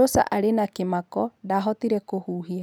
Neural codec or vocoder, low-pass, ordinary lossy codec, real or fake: none; none; none; real